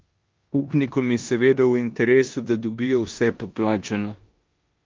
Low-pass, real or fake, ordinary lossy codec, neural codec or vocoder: 7.2 kHz; fake; Opus, 24 kbps; codec, 16 kHz in and 24 kHz out, 0.9 kbps, LongCat-Audio-Codec, four codebook decoder